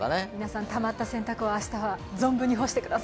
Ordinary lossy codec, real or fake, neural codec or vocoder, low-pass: none; real; none; none